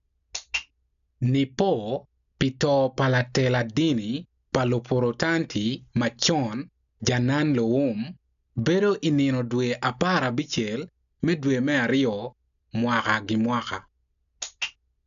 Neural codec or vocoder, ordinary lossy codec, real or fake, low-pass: none; none; real; 7.2 kHz